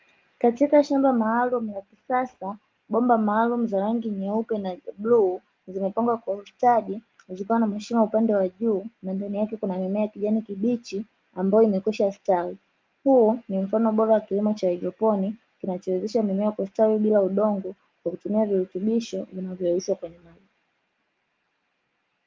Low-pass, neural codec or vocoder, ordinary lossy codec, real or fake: 7.2 kHz; none; Opus, 32 kbps; real